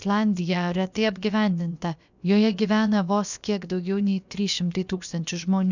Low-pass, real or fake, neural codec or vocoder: 7.2 kHz; fake; codec, 16 kHz, about 1 kbps, DyCAST, with the encoder's durations